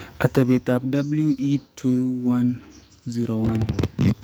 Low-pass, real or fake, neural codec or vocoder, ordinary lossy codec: none; fake; codec, 44.1 kHz, 2.6 kbps, SNAC; none